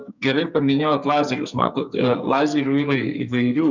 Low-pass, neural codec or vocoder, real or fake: 7.2 kHz; codec, 44.1 kHz, 2.6 kbps, SNAC; fake